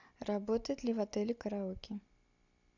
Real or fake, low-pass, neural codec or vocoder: real; 7.2 kHz; none